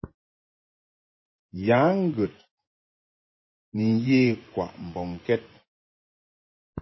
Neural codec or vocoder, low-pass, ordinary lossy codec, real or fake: vocoder, 24 kHz, 100 mel bands, Vocos; 7.2 kHz; MP3, 24 kbps; fake